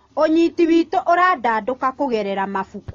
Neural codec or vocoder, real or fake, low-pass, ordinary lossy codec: none; real; 7.2 kHz; AAC, 32 kbps